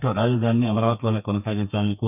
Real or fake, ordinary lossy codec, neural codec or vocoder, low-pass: fake; none; codec, 32 kHz, 1.9 kbps, SNAC; 3.6 kHz